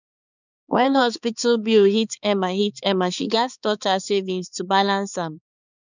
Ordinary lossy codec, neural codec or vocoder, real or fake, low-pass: none; codec, 16 kHz, 4 kbps, X-Codec, HuBERT features, trained on balanced general audio; fake; 7.2 kHz